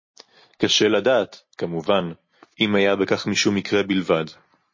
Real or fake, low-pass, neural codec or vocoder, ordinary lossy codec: real; 7.2 kHz; none; MP3, 32 kbps